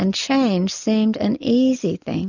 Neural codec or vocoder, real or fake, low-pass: none; real; 7.2 kHz